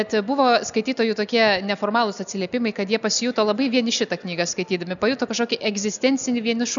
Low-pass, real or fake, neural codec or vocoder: 7.2 kHz; real; none